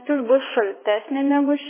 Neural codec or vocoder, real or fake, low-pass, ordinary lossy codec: codec, 16 kHz, 2 kbps, X-Codec, HuBERT features, trained on balanced general audio; fake; 3.6 kHz; MP3, 16 kbps